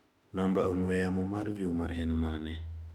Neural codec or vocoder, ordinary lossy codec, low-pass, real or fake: autoencoder, 48 kHz, 32 numbers a frame, DAC-VAE, trained on Japanese speech; none; 19.8 kHz; fake